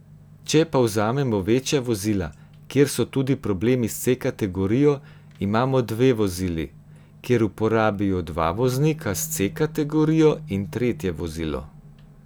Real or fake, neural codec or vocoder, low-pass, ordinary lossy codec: real; none; none; none